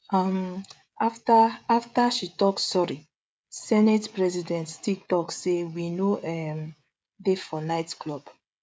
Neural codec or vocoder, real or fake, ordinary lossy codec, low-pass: codec, 16 kHz, 16 kbps, FreqCodec, smaller model; fake; none; none